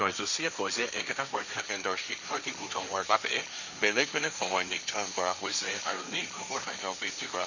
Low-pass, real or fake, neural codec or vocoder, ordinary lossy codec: 7.2 kHz; fake; codec, 16 kHz, 1.1 kbps, Voila-Tokenizer; none